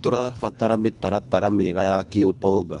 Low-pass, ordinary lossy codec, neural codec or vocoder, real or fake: 10.8 kHz; none; codec, 24 kHz, 1.5 kbps, HILCodec; fake